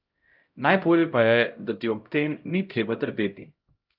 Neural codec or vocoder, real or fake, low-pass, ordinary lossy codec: codec, 16 kHz, 0.5 kbps, X-Codec, HuBERT features, trained on LibriSpeech; fake; 5.4 kHz; Opus, 24 kbps